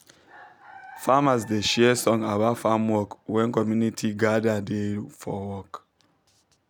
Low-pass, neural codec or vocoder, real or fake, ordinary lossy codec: 19.8 kHz; none; real; none